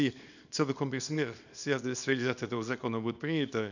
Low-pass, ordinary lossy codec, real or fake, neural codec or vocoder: 7.2 kHz; none; fake; codec, 24 kHz, 0.9 kbps, WavTokenizer, small release